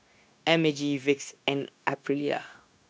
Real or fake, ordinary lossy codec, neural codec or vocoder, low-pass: fake; none; codec, 16 kHz, 0.9 kbps, LongCat-Audio-Codec; none